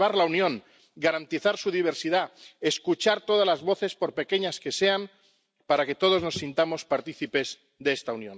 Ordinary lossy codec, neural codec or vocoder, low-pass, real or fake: none; none; none; real